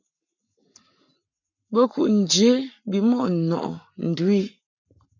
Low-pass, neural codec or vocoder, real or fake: 7.2 kHz; codec, 44.1 kHz, 7.8 kbps, Pupu-Codec; fake